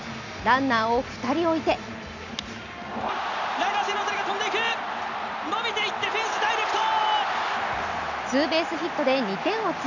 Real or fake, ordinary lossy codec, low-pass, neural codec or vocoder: real; none; 7.2 kHz; none